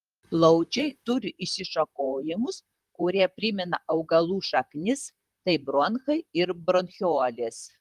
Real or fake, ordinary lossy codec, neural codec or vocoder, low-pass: fake; Opus, 32 kbps; vocoder, 44.1 kHz, 128 mel bands, Pupu-Vocoder; 14.4 kHz